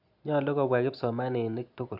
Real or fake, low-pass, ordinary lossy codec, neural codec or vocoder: real; 5.4 kHz; AAC, 48 kbps; none